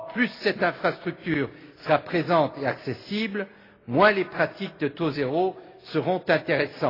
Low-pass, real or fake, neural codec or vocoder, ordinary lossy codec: 5.4 kHz; real; none; AAC, 24 kbps